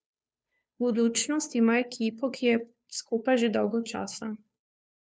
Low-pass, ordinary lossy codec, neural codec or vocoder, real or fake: none; none; codec, 16 kHz, 2 kbps, FunCodec, trained on Chinese and English, 25 frames a second; fake